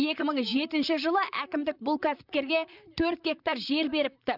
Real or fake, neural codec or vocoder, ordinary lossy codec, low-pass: fake; vocoder, 44.1 kHz, 128 mel bands, Pupu-Vocoder; none; 5.4 kHz